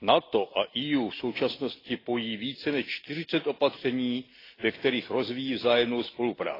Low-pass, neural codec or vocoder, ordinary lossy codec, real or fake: 5.4 kHz; none; AAC, 24 kbps; real